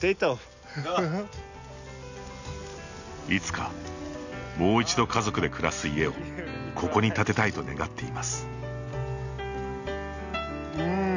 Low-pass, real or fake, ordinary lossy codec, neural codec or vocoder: 7.2 kHz; real; none; none